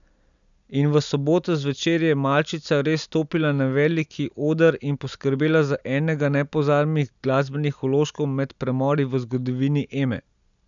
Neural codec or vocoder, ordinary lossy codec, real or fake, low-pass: none; none; real; 7.2 kHz